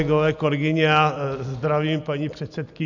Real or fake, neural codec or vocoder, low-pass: real; none; 7.2 kHz